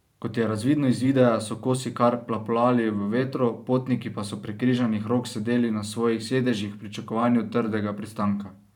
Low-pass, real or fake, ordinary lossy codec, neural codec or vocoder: 19.8 kHz; fake; none; vocoder, 44.1 kHz, 128 mel bands every 512 samples, BigVGAN v2